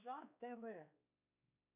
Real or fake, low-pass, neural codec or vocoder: fake; 3.6 kHz; codec, 16 kHz, 2 kbps, X-Codec, WavLM features, trained on Multilingual LibriSpeech